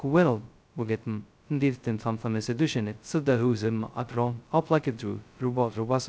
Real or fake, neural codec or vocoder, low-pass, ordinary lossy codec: fake; codec, 16 kHz, 0.2 kbps, FocalCodec; none; none